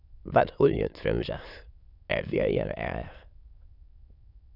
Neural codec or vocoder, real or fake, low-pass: autoencoder, 22.05 kHz, a latent of 192 numbers a frame, VITS, trained on many speakers; fake; 5.4 kHz